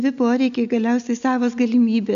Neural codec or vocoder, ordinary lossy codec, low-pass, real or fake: none; AAC, 96 kbps; 7.2 kHz; real